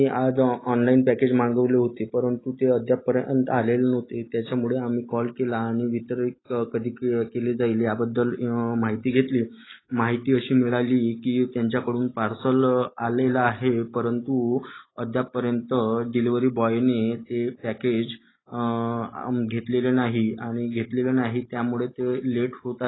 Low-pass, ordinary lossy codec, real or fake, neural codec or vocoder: 7.2 kHz; AAC, 16 kbps; real; none